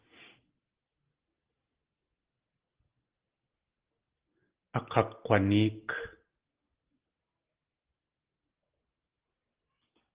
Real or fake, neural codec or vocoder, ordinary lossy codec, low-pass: real; none; Opus, 16 kbps; 3.6 kHz